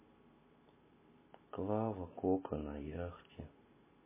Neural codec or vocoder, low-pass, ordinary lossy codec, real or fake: none; 3.6 kHz; MP3, 16 kbps; real